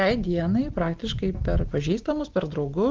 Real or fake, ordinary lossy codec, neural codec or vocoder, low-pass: real; Opus, 16 kbps; none; 7.2 kHz